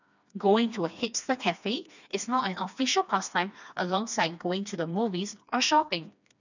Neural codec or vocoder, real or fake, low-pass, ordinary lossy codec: codec, 16 kHz, 2 kbps, FreqCodec, smaller model; fake; 7.2 kHz; none